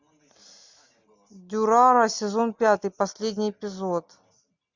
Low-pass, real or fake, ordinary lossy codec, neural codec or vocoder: 7.2 kHz; real; MP3, 64 kbps; none